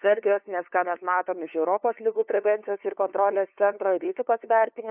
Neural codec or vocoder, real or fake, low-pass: codec, 16 kHz, 2 kbps, FunCodec, trained on LibriTTS, 25 frames a second; fake; 3.6 kHz